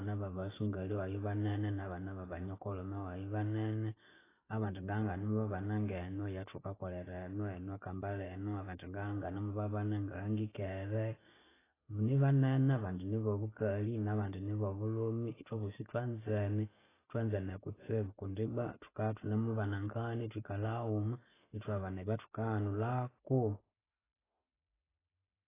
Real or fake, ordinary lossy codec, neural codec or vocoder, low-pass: real; AAC, 16 kbps; none; 3.6 kHz